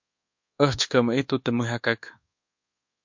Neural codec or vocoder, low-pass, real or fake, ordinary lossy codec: codec, 24 kHz, 1.2 kbps, DualCodec; 7.2 kHz; fake; MP3, 48 kbps